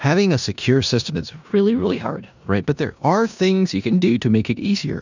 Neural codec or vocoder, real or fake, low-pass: codec, 16 kHz in and 24 kHz out, 0.9 kbps, LongCat-Audio-Codec, four codebook decoder; fake; 7.2 kHz